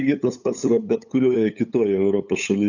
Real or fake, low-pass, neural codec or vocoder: fake; 7.2 kHz; codec, 16 kHz, 8 kbps, FunCodec, trained on LibriTTS, 25 frames a second